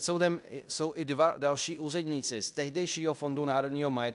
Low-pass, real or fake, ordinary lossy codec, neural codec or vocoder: 10.8 kHz; fake; AAC, 96 kbps; codec, 16 kHz in and 24 kHz out, 0.9 kbps, LongCat-Audio-Codec, fine tuned four codebook decoder